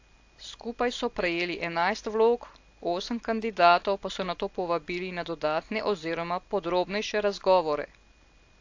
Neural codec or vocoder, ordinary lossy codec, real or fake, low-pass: none; AAC, 48 kbps; real; 7.2 kHz